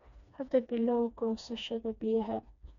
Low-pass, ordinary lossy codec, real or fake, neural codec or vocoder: 7.2 kHz; none; fake; codec, 16 kHz, 2 kbps, FreqCodec, smaller model